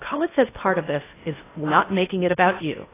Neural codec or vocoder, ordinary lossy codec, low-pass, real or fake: codec, 16 kHz in and 24 kHz out, 0.6 kbps, FocalCodec, streaming, 2048 codes; AAC, 16 kbps; 3.6 kHz; fake